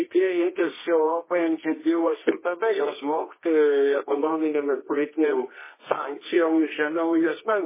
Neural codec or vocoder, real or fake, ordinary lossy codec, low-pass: codec, 24 kHz, 0.9 kbps, WavTokenizer, medium music audio release; fake; MP3, 16 kbps; 3.6 kHz